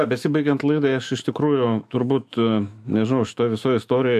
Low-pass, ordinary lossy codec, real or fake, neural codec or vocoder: 14.4 kHz; AAC, 96 kbps; fake; autoencoder, 48 kHz, 128 numbers a frame, DAC-VAE, trained on Japanese speech